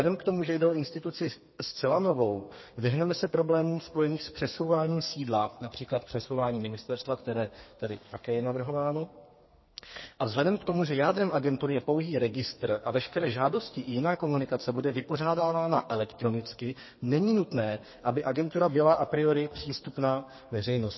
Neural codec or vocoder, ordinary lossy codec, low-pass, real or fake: codec, 32 kHz, 1.9 kbps, SNAC; MP3, 24 kbps; 7.2 kHz; fake